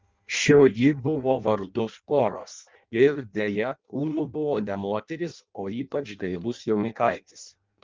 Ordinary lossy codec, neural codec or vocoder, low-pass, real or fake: Opus, 32 kbps; codec, 16 kHz in and 24 kHz out, 0.6 kbps, FireRedTTS-2 codec; 7.2 kHz; fake